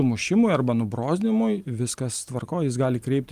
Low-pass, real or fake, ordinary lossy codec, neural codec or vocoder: 14.4 kHz; real; Opus, 32 kbps; none